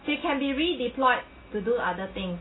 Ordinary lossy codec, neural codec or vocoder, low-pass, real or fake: AAC, 16 kbps; none; 7.2 kHz; real